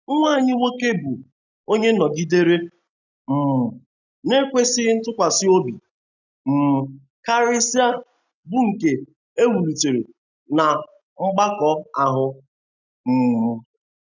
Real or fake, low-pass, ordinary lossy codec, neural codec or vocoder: real; 7.2 kHz; none; none